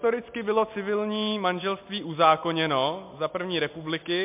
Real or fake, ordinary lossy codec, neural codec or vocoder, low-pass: real; MP3, 32 kbps; none; 3.6 kHz